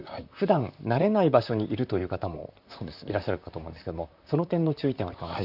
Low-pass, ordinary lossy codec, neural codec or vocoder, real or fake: 5.4 kHz; none; vocoder, 44.1 kHz, 128 mel bands, Pupu-Vocoder; fake